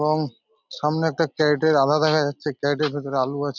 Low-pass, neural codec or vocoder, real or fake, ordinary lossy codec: 7.2 kHz; none; real; none